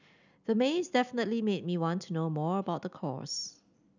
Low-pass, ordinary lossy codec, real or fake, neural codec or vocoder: 7.2 kHz; none; real; none